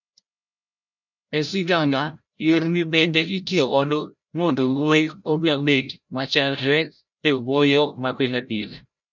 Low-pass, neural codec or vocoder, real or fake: 7.2 kHz; codec, 16 kHz, 0.5 kbps, FreqCodec, larger model; fake